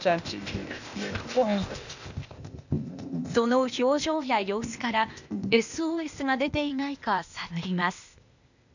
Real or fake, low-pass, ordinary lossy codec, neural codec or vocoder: fake; 7.2 kHz; none; codec, 16 kHz, 0.8 kbps, ZipCodec